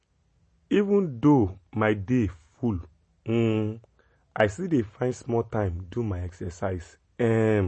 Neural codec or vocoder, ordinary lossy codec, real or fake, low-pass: none; MP3, 32 kbps; real; 10.8 kHz